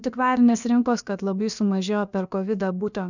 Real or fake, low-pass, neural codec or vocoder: fake; 7.2 kHz; codec, 16 kHz, about 1 kbps, DyCAST, with the encoder's durations